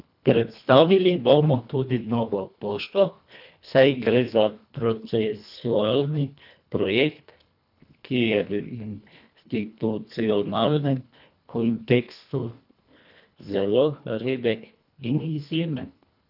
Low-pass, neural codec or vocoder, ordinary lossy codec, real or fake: 5.4 kHz; codec, 24 kHz, 1.5 kbps, HILCodec; none; fake